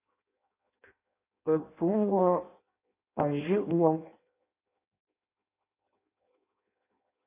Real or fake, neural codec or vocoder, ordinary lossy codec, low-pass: fake; codec, 16 kHz in and 24 kHz out, 0.6 kbps, FireRedTTS-2 codec; AAC, 24 kbps; 3.6 kHz